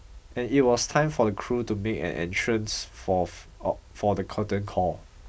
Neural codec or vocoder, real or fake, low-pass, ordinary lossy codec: none; real; none; none